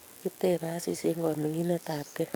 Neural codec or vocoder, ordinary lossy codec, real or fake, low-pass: codec, 44.1 kHz, 7.8 kbps, DAC; none; fake; none